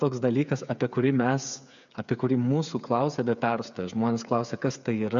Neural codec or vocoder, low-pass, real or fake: codec, 16 kHz, 8 kbps, FreqCodec, smaller model; 7.2 kHz; fake